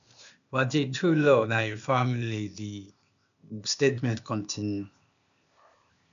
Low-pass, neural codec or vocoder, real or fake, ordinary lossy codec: 7.2 kHz; codec, 16 kHz, 0.8 kbps, ZipCodec; fake; none